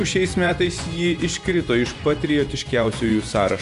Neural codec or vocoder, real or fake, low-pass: vocoder, 24 kHz, 100 mel bands, Vocos; fake; 10.8 kHz